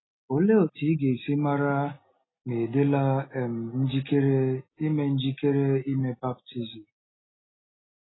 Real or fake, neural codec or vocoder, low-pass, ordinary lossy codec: real; none; 7.2 kHz; AAC, 16 kbps